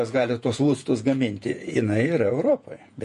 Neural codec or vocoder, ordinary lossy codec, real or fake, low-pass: vocoder, 48 kHz, 128 mel bands, Vocos; MP3, 48 kbps; fake; 14.4 kHz